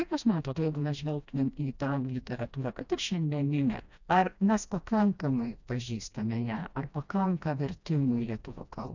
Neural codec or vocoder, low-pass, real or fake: codec, 16 kHz, 1 kbps, FreqCodec, smaller model; 7.2 kHz; fake